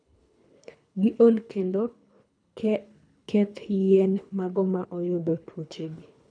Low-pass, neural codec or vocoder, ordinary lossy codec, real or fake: 9.9 kHz; codec, 24 kHz, 3 kbps, HILCodec; none; fake